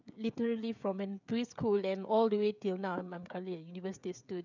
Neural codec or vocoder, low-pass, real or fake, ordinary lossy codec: codec, 16 kHz, 16 kbps, FreqCodec, smaller model; 7.2 kHz; fake; none